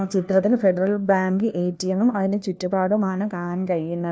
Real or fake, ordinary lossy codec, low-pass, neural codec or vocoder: fake; none; none; codec, 16 kHz, 1 kbps, FunCodec, trained on LibriTTS, 50 frames a second